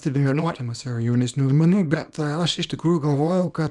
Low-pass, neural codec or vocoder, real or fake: 10.8 kHz; codec, 24 kHz, 0.9 kbps, WavTokenizer, small release; fake